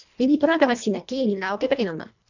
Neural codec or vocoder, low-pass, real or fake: codec, 24 kHz, 1.5 kbps, HILCodec; 7.2 kHz; fake